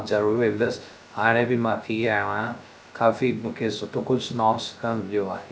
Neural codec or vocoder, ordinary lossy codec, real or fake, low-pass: codec, 16 kHz, 0.3 kbps, FocalCodec; none; fake; none